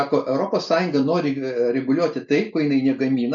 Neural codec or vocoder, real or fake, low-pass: none; real; 7.2 kHz